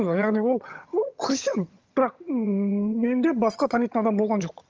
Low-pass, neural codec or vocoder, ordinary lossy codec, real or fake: 7.2 kHz; vocoder, 22.05 kHz, 80 mel bands, HiFi-GAN; Opus, 24 kbps; fake